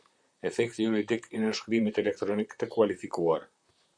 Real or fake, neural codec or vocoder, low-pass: fake; vocoder, 44.1 kHz, 128 mel bands, Pupu-Vocoder; 9.9 kHz